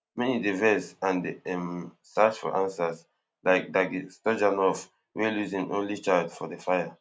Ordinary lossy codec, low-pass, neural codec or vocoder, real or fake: none; none; none; real